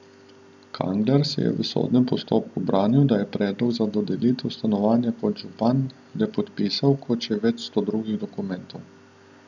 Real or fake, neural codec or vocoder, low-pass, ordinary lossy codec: real; none; 7.2 kHz; none